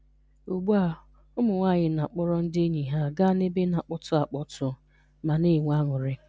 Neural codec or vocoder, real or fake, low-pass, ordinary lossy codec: none; real; none; none